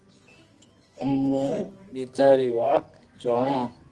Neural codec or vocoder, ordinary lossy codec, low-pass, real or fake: codec, 24 kHz, 0.9 kbps, WavTokenizer, medium music audio release; Opus, 24 kbps; 10.8 kHz; fake